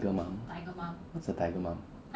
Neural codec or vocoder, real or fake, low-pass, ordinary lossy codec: none; real; none; none